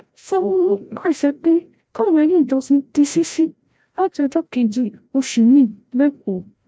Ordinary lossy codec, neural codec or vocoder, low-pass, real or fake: none; codec, 16 kHz, 0.5 kbps, FreqCodec, larger model; none; fake